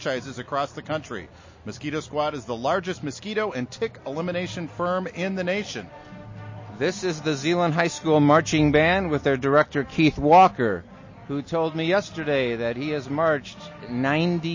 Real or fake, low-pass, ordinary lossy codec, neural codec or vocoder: real; 7.2 kHz; MP3, 32 kbps; none